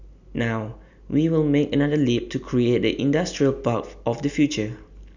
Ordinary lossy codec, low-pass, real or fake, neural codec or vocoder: none; 7.2 kHz; real; none